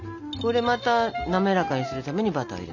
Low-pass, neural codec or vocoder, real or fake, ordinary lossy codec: 7.2 kHz; none; real; none